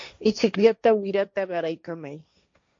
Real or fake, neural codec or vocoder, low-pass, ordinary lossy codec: fake; codec, 16 kHz, 1.1 kbps, Voila-Tokenizer; 7.2 kHz; MP3, 48 kbps